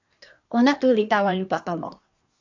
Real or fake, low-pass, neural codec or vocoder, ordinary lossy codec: fake; 7.2 kHz; codec, 24 kHz, 1 kbps, SNAC; AAC, 48 kbps